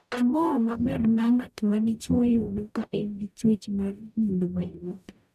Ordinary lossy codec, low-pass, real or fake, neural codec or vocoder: none; 14.4 kHz; fake; codec, 44.1 kHz, 0.9 kbps, DAC